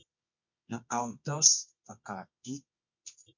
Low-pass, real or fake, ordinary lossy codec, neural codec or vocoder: 7.2 kHz; fake; MP3, 48 kbps; codec, 24 kHz, 0.9 kbps, WavTokenizer, medium music audio release